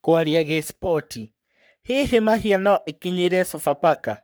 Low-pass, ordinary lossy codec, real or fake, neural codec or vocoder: none; none; fake; codec, 44.1 kHz, 3.4 kbps, Pupu-Codec